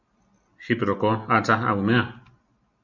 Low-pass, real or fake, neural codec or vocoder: 7.2 kHz; real; none